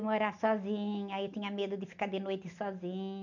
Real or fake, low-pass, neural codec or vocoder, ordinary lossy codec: real; 7.2 kHz; none; none